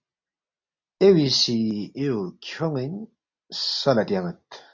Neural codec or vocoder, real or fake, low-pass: none; real; 7.2 kHz